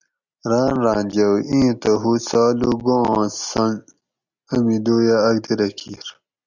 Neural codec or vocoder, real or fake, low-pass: none; real; 7.2 kHz